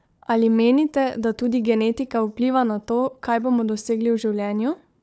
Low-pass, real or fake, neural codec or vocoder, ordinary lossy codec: none; fake; codec, 16 kHz, 4 kbps, FunCodec, trained on Chinese and English, 50 frames a second; none